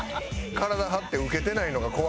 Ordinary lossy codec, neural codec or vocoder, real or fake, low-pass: none; none; real; none